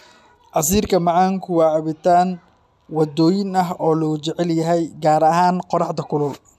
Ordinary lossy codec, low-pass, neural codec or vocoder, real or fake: none; 14.4 kHz; none; real